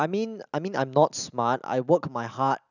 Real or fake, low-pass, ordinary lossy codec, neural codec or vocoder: real; 7.2 kHz; none; none